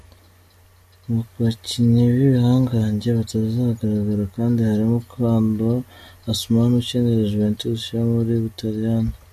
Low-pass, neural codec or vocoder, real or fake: 14.4 kHz; none; real